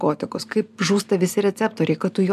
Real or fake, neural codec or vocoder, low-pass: real; none; 14.4 kHz